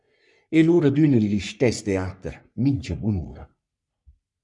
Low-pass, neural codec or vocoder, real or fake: 10.8 kHz; codec, 44.1 kHz, 3.4 kbps, Pupu-Codec; fake